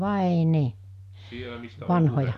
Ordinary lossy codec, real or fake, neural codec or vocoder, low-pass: none; real; none; 14.4 kHz